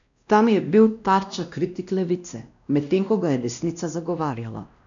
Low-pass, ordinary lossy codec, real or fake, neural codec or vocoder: 7.2 kHz; none; fake; codec, 16 kHz, 1 kbps, X-Codec, WavLM features, trained on Multilingual LibriSpeech